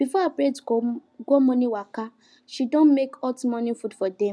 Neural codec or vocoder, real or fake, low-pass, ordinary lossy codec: none; real; none; none